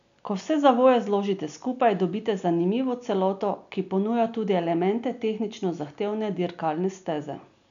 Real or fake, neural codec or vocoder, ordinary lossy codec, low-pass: real; none; none; 7.2 kHz